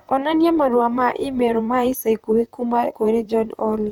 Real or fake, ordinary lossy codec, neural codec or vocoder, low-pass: fake; none; vocoder, 44.1 kHz, 128 mel bands, Pupu-Vocoder; 19.8 kHz